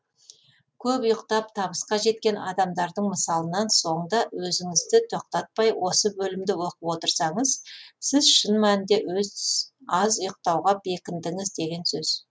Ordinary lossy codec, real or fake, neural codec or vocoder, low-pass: none; real; none; none